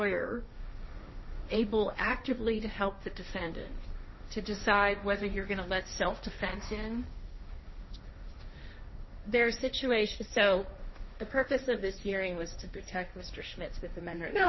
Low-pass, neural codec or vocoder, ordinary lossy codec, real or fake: 7.2 kHz; codec, 16 kHz, 1.1 kbps, Voila-Tokenizer; MP3, 24 kbps; fake